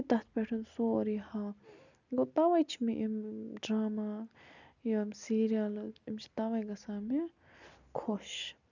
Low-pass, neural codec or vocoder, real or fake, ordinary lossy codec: 7.2 kHz; none; real; none